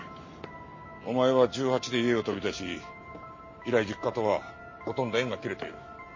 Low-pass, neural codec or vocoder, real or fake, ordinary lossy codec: 7.2 kHz; none; real; MP3, 32 kbps